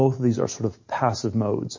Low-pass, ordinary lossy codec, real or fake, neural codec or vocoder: 7.2 kHz; MP3, 32 kbps; real; none